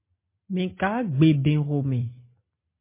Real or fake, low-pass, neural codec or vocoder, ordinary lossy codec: real; 3.6 kHz; none; MP3, 24 kbps